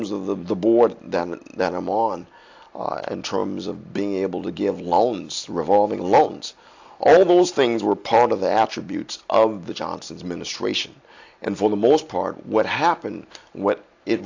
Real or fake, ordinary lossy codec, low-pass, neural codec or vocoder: real; AAC, 48 kbps; 7.2 kHz; none